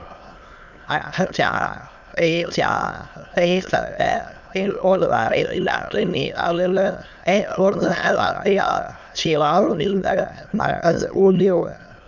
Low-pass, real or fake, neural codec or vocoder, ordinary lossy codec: 7.2 kHz; fake; autoencoder, 22.05 kHz, a latent of 192 numbers a frame, VITS, trained on many speakers; none